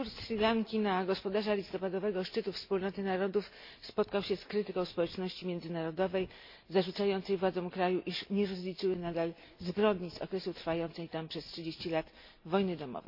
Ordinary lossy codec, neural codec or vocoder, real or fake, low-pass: MP3, 32 kbps; none; real; 5.4 kHz